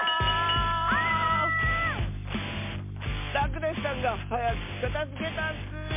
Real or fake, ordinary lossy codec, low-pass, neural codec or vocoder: real; MP3, 24 kbps; 3.6 kHz; none